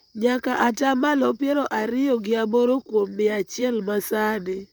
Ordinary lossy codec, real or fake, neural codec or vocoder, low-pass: none; fake; vocoder, 44.1 kHz, 128 mel bands, Pupu-Vocoder; none